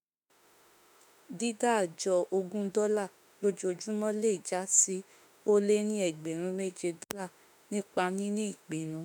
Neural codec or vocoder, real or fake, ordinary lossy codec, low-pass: autoencoder, 48 kHz, 32 numbers a frame, DAC-VAE, trained on Japanese speech; fake; none; none